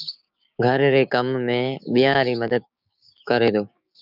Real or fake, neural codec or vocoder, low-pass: fake; codec, 16 kHz, 6 kbps, DAC; 5.4 kHz